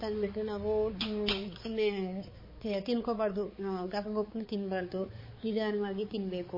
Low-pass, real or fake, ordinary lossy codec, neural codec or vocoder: 5.4 kHz; fake; MP3, 24 kbps; codec, 16 kHz, 4 kbps, X-Codec, HuBERT features, trained on balanced general audio